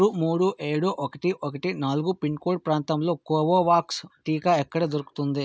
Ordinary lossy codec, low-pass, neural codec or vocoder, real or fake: none; none; none; real